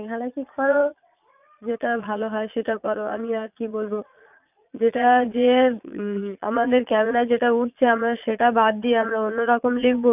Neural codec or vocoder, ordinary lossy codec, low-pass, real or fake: vocoder, 22.05 kHz, 80 mel bands, Vocos; none; 3.6 kHz; fake